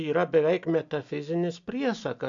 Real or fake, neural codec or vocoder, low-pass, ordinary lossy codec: real; none; 7.2 kHz; AAC, 64 kbps